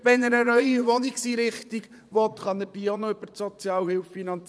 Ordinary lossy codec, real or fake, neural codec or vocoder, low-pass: none; fake; vocoder, 22.05 kHz, 80 mel bands, Vocos; none